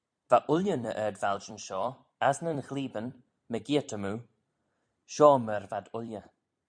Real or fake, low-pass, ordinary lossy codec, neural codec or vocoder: fake; 9.9 kHz; MP3, 64 kbps; vocoder, 44.1 kHz, 128 mel bands every 256 samples, BigVGAN v2